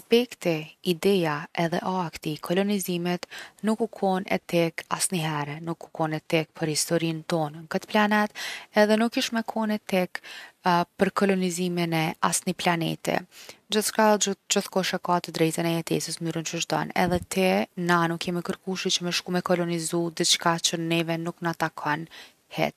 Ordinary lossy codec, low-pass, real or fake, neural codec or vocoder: none; 14.4 kHz; real; none